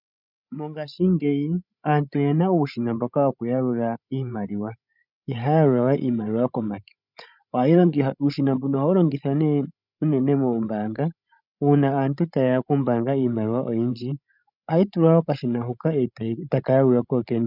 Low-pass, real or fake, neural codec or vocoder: 5.4 kHz; fake; codec, 16 kHz, 16 kbps, FreqCodec, larger model